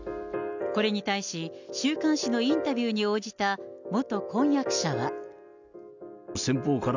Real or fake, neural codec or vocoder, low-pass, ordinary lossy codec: real; none; 7.2 kHz; none